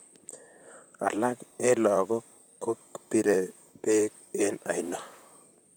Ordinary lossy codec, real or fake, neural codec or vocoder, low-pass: none; fake; vocoder, 44.1 kHz, 128 mel bands, Pupu-Vocoder; none